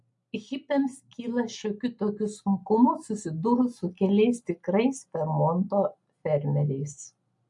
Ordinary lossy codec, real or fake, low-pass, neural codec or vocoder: MP3, 48 kbps; real; 10.8 kHz; none